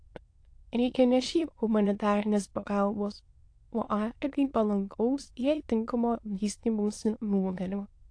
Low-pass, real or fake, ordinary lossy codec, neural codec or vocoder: 9.9 kHz; fake; AAC, 48 kbps; autoencoder, 22.05 kHz, a latent of 192 numbers a frame, VITS, trained on many speakers